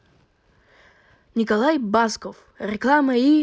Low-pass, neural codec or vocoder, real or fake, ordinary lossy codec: none; none; real; none